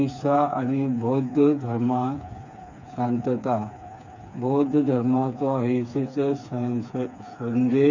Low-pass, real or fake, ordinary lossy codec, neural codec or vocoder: 7.2 kHz; fake; none; codec, 16 kHz, 4 kbps, FreqCodec, smaller model